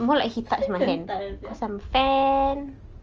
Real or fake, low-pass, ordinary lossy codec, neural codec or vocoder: real; 7.2 kHz; Opus, 24 kbps; none